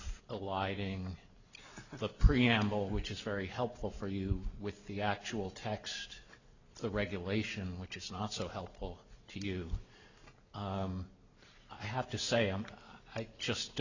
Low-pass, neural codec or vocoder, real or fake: 7.2 kHz; none; real